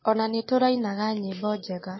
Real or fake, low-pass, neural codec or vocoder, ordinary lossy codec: real; 7.2 kHz; none; MP3, 24 kbps